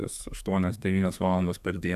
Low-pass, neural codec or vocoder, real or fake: 14.4 kHz; codec, 32 kHz, 1.9 kbps, SNAC; fake